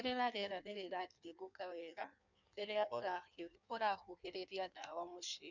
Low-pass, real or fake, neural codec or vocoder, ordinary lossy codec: 7.2 kHz; fake; codec, 16 kHz in and 24 kHz out, 1.1 kbps, FireRedTTS-2 codec; none